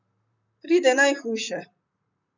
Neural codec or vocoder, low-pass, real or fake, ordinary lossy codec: vocoder, 44.1 kHz, 128 mel bands, Pupu-Vocoder; 7.2 kHz; fake; none